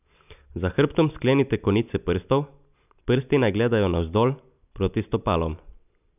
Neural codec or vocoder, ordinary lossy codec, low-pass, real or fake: none; none; 3.6 kHz; real